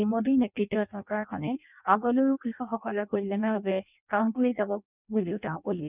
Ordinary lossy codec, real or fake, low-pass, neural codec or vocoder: none; fake; 3.6 kHz; codec, 16 kHz in and 24 kHz out, 0.6 kbps, FireRedTTS-2 codec